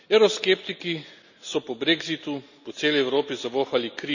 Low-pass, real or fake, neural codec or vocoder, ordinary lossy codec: 7.2 kHz; real; none; none